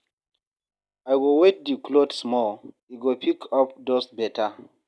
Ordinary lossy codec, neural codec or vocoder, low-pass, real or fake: none; none; none; real